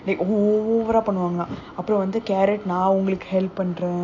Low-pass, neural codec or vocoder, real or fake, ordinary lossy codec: 7.2 kHz; none; real; AAC, 48 kbps